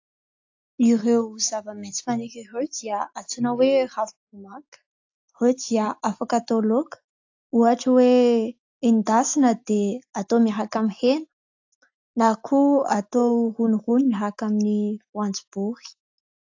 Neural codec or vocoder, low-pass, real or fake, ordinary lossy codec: none; 7.2 kHz; real; AAC, 48 kbps